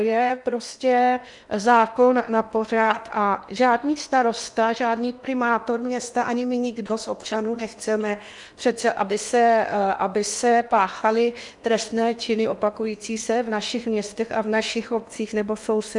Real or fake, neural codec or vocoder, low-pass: fake; codec, 16 kHz in and 24 kHz out, 0.8 kbps, FocalCodec, streaming, 65536 codes; 10.8 kHz